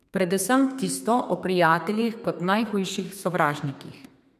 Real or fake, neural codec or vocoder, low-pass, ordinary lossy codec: fake; codec, 32 kHz, 1.9 kbps, SNAC; 14.4 kHz; none